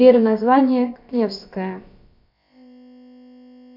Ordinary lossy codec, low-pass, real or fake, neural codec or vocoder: MP3, 48 kbps; 5.4 kHz; fake; codec, 16 kHz, about 1 kbps, DyCAST, with the encoder's durations